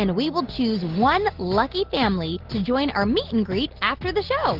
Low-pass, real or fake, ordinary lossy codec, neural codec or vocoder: 5.4 kHz; real; Opus, 16 kbps; none